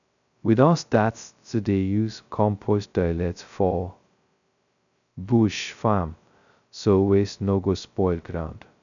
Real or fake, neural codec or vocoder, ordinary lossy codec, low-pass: fake; codec, 16 kHz, 0.2 kbps, FocalCodec; none; 7.2 kHz